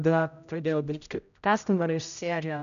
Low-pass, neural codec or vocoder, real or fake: 7.2 kHz; codec, 16 kHz, 0.5 kbps, X-Codec, HuBERT features, trained on general audio; fake